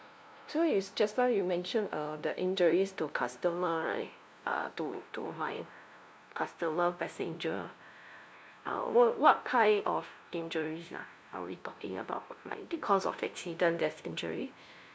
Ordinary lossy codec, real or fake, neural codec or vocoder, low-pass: none; fake; codec, 16 kHz, 0.5 kbps, FunCodec, trained on LibriTTS, 25 frames a second; none